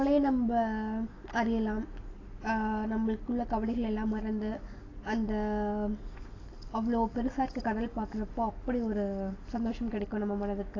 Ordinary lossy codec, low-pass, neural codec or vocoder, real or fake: AAC, 32 kbps; 7.2 kHz; none; real